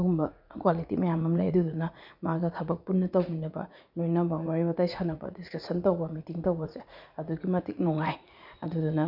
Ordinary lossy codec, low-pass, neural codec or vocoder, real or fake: none; 5.4 kHz; vocoder, 44.1 kHz, 80 mel bands, Vocos; fake